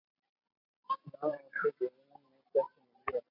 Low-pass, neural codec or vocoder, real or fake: 5.4 kHz; vocoder, 44.1 kHz, 128 mel bands every 256 samples, BigVGAN v2; fake